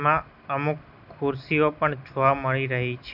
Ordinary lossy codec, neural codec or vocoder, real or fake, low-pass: none; none; real; 5.4 kHz